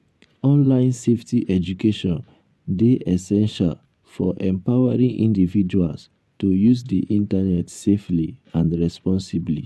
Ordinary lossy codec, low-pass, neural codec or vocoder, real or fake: none; none; vocoder, 24 kHz, 100 mel bands, Vocos; fake